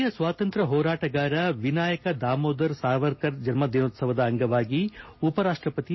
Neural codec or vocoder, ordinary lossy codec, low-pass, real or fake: none; MP3, 24 kbps; 7.2 kHz; real